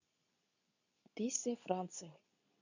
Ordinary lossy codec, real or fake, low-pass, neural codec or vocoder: none; fake; 7.2 kHz; codec, 24 kHz, 0.9 kbps, WavTokenizer, medium speech release version 2